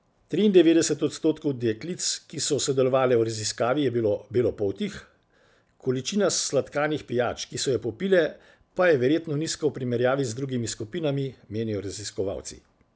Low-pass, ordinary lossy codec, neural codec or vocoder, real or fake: none; none; none; real